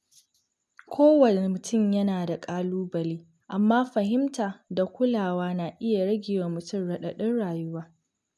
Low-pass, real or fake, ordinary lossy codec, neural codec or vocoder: none; real; none; none